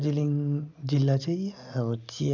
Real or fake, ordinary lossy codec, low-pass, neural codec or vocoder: real; none; 7.2 kHz; none